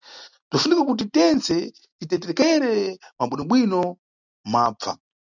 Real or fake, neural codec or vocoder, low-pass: real; none; 7.2 kHz